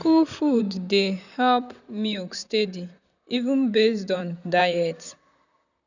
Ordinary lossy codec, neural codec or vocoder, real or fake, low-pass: none; vocoder, 44.1 kHz, 128 mel bands, Pupu-Vocoder; fake; 7.2 kHz